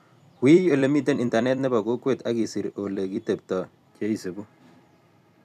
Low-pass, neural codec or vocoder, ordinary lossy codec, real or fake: 14.4 kHz; vocoder, 44.1 kHz, 128 mel bands every 256 samples, BigVGAN v2; none; fake